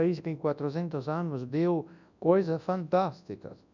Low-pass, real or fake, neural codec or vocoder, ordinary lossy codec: 7.2 kHz; fake; codec, 24 kHz, 0.9 kbps, WavTokenizer, large speech release; none